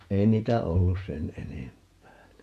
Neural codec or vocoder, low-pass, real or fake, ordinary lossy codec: vocoder, 48 kHz, 128 mel bands, Vocos; 14.4 kHz; fake; AAC, 96 kbps